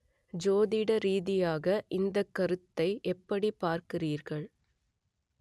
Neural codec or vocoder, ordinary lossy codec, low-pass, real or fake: none; none; none; real